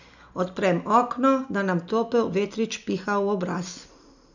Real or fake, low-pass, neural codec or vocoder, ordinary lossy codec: real; 7.2 kHz; none; none